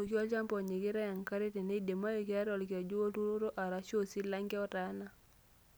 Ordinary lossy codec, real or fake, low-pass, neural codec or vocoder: none; real; none; none